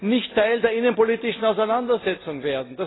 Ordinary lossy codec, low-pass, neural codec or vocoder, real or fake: AAC, 16 kbps; 7.2 kHz; none; real